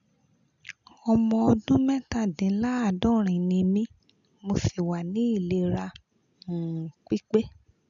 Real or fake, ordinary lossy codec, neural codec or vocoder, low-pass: real; none; none; 7.2 kHz